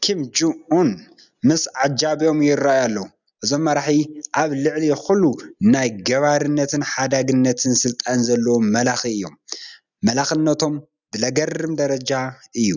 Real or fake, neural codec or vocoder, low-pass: real; none; 7.2 kHz